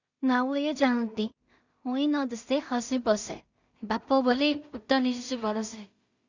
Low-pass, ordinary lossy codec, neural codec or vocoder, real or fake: 7.2 kHz; Opus, 64 kbps; codec, 16 kHz in and 24 kHz out, 0.4 kbps, LongCat-Audio-Codec, two codebook decoder; fake